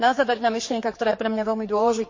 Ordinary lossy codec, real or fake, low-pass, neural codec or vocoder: MP3, 32 kbps; fake; 7.2 kHz; codec, 16 kHz, 4 kbps, X-Codec, HuBERT features, trained on general audio